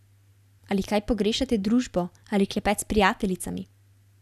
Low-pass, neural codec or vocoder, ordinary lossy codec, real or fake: 14.4 kHz; none; none; real